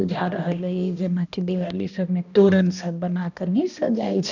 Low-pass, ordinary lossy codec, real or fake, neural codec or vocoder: 7.2 kHz; none; fake; codec, 16 kHz, 1 kbps, X-Codec, HuBERT features, trained on balanced general audio